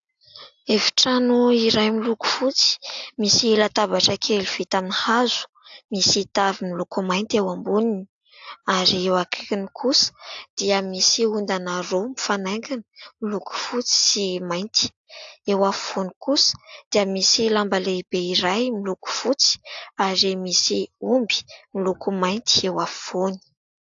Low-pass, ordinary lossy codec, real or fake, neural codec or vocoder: 7.2 kHz; AAC, 64 kbps; real; none